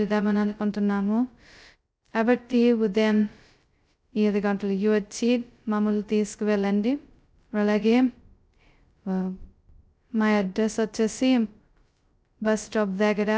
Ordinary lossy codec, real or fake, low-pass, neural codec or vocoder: none; fake; none; codec, 16 kHz, 0.2 kbps, FocalCodec